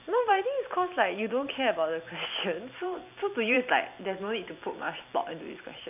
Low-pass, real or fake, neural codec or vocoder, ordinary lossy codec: 3.6 kHz; fake; vocoder, 44.1 kHz, 128 mel bands every 256 samples, BigVGAN v2; none